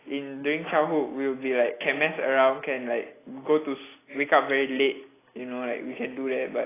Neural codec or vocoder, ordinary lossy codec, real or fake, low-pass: none; AAC, 16 kbps; real; 3.6 kHz